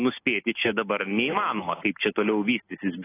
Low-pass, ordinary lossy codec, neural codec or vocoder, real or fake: 3.6 kHz; AAC, 16 kbps; none; real